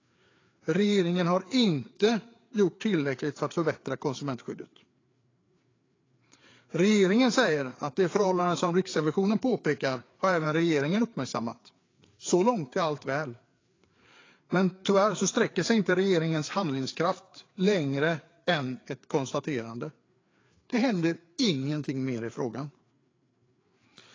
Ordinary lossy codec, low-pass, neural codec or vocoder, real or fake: AAC, 32 kbps; 7.2 kHz; codec, 16 kHz, 4 kbps, FreqCodec, larger model; fake